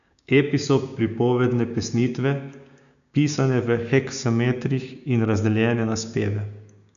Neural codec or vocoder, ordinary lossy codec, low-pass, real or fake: codec, 16 kHz, 6 kbps, DAC; none; 7.2 kHz; fake